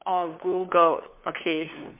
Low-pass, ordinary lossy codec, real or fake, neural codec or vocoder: 3.6 kHz; MP3, 32 kbps; fake; codec, 16 kHz, 2 kbps, FunCodec, trained on LibriTTS, 25 frames a second